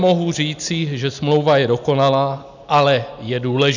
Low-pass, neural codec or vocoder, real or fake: 7.2 kHz; none; real